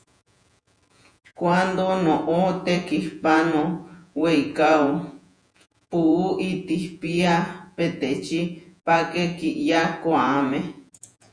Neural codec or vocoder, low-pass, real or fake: vocoder, 48 kHz, 128 mel bands, Vocos; 9.9 kHz; fake